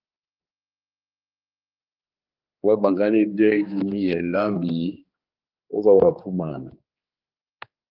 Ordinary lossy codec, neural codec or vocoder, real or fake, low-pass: Opus, 24 kbps; codec, 16 kHz, 2 kbps, X-Codec, HuBERT features, trained on general audio; fake; 5.4 kHz